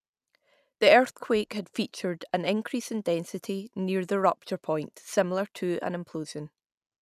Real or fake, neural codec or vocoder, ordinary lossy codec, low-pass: real; none; none; 14.4 kHz